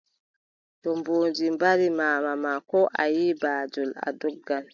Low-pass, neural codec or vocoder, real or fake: 7.2 kHz; none; real